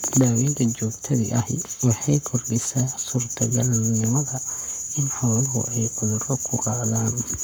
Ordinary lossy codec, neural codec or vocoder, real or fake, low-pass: none; codec, 44.1 kHz, 7.8 kbps, Pupu-Codec; fake; none